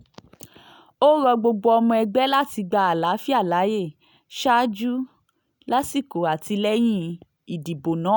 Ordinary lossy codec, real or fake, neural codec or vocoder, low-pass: none; real; none; none